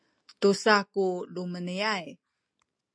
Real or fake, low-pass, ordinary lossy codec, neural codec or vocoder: real; 9.9 kHz; MP3, 64 kbps; none